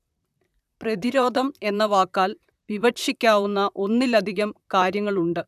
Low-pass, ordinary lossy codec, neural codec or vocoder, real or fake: 14.4 kHz; none; vocoder, 44.1 kHz, 128 mel bands, Pupu-Vocoder; fake